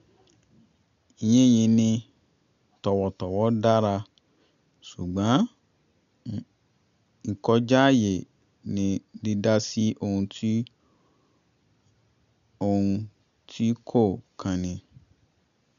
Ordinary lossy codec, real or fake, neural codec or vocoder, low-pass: none; real; none; 7.2 kHz